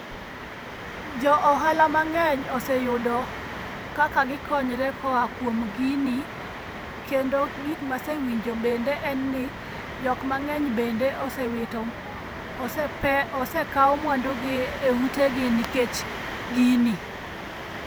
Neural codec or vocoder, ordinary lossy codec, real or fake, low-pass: vocoder, 44.1 kHz, 128 mel bands every 512 samples, BigVGAN v2; none; fake; none